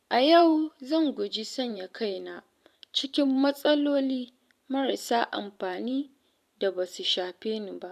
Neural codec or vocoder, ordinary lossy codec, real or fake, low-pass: vocoder, 44.1 kHz, 128 mel bands every 512 samples, BigVGAN v2; none; fake; 14.4 kHz